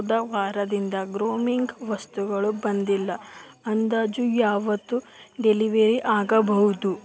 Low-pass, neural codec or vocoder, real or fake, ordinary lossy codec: none; none; real; none